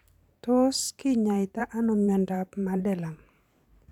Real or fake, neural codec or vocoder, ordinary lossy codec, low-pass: fake; vocoder, 44.1 kHz, 128 mel bands every 512 samples, BigVGAN v2; none; 19.8 kHz